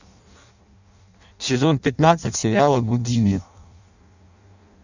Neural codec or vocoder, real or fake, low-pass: codec, 16 kHz in and 24 kHz out, 0.6 kbps, FireRedTTS-2 codec; fake; 7.2 kHz